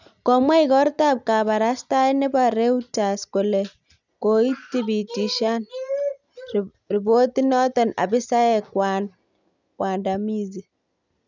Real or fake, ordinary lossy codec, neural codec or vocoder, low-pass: real; none; none; 7.2 kHz